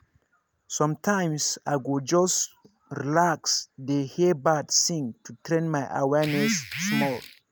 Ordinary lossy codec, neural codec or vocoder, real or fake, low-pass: none; none; real; none